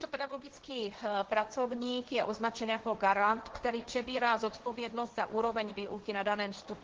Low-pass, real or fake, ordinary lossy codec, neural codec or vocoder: 7.2 kHz; fake; Opus, 16 kbps; codec, 16 kHz, 1.1 kbps, Voila-Tokenizer